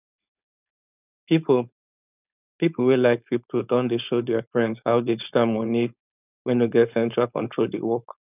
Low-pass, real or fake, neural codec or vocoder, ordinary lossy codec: 3.6 kHz; fake; codec, 16 kHz, 4.8 kbps, FACodec; none